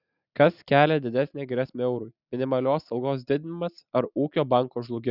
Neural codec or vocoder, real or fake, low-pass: none; real; 5.4 kHz